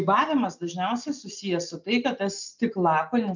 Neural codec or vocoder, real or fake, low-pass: none; real; 7.2 kHz